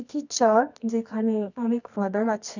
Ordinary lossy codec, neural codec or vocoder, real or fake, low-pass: none; codec, 24 kHz, 0.9 kbps, WavTokenizer, medium music audio release; fake; 7.2 kHz